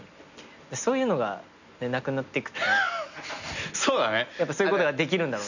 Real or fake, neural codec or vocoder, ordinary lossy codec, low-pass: real; none; none; 7.2 kHz